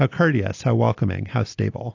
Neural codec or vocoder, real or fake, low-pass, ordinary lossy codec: none; real; 7.2 kHz; AAC, 48 kbps